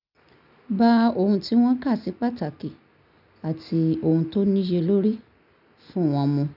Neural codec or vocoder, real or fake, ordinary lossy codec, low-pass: none; real; none; 5.4 kHz